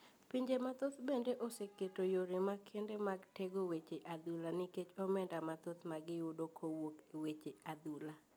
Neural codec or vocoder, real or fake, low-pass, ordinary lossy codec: none; real; none; none